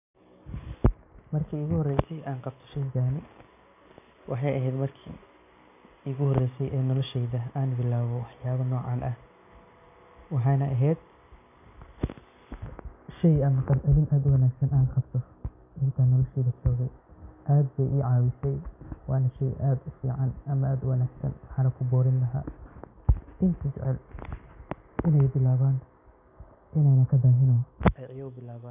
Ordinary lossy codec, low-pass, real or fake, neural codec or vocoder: none; 3.6 kHz; real; none